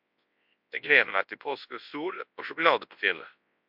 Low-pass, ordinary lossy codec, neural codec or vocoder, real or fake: 5.4 kHz; MP3, 48 kbps; codec, 24 kHz, 0.9 kbps, WavTokenizer, large speech release; fake